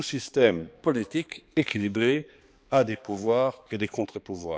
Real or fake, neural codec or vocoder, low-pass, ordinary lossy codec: fake; codec, 16 kHz, 2 kbps, X-Codec, HuBERT features, trained on balanced general audio; none; none